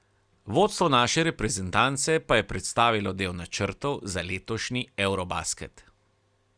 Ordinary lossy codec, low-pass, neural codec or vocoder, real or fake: Opus, 64 kbps; 9.9 kHz; none; real